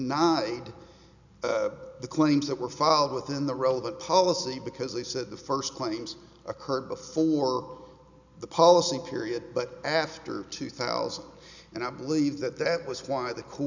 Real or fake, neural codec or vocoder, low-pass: real; none; 7.2 kHz